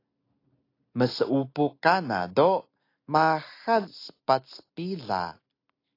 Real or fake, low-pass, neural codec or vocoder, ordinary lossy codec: real; 5.4 kHz; none; AAC, 32 kbps